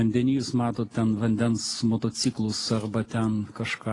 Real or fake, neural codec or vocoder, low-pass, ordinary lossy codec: real; none; 10.8 kHz; AAC, 32 kbps